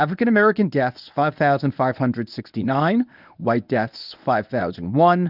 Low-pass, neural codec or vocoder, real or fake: 5.4 kHz; codec, 24 kHz, 0.9 kbps, WavTokenizer, medium speech release version 1; fake